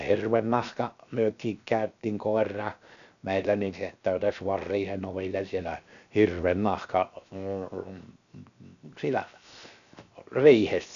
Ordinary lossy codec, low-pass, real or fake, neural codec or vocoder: none; 7.2 kHz; fake; codec, 16 kHz, 0.7 kbps, FocalCodec